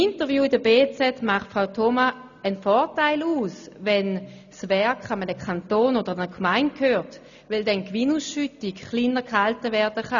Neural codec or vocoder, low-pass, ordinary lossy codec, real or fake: none; 7.2 kHz; none; real